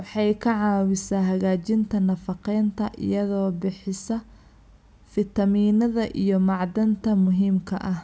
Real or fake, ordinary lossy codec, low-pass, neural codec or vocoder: real; none; none; none